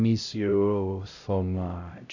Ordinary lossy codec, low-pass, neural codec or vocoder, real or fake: none; 7.2 kHz; codec, 16 kHz, 0.5 kbps, X-Codec, HuBERT features, trained on LibriSpeech; fake